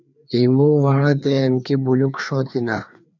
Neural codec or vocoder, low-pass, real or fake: codec, 16 kHz, 2 kbps, FreqCodec, larger model; 7.2 kHz; fake